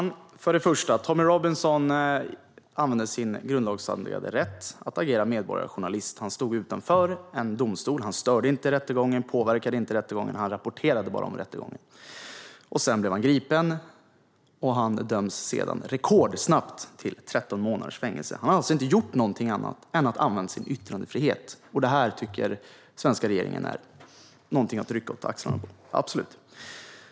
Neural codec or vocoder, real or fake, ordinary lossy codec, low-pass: none; real; none; none